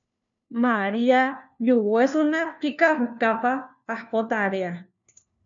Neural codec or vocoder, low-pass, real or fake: codec, 16 kHz, 1 kbps, FunCodec, trained on LibriTTS, 50 frames a second; 7.2 kHz; fake